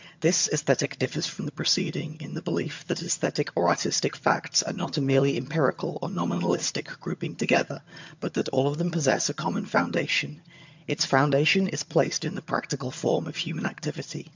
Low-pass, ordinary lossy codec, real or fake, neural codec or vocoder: 7.2 kHz; MP3, 64 kbps; fake; vocoder, 22.05 kHz, 80 mel bands, HiFi-GAN